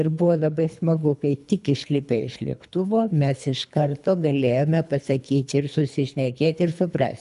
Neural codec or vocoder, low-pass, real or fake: codec, 24 kHz, 3 kbps, HILCodec; 10.8 kHz; fake